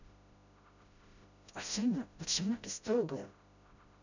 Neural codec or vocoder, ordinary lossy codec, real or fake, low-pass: codec, 16 kHz, 0.5 kbps, FreqCodec, smaller model; none; fake; 7.2 kHz